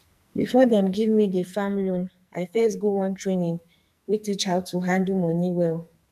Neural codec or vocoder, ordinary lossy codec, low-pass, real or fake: codec, 44.1 kHz, 2.6 kbps, SNAC; none; 14.4 kHz; fake